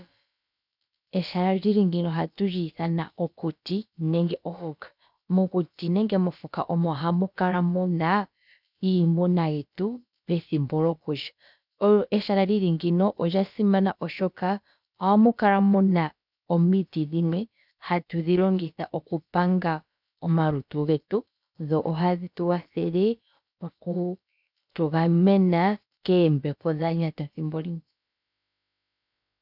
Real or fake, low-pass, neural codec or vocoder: fake; 5.4 kHz; codec, 16 kHz, about 1 kbps, DyCAST, with the encoder's durations